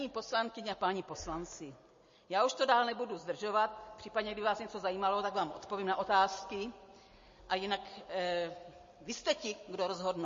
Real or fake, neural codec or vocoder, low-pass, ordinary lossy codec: real; none; 7.2 kHz; MP3, 32 kbps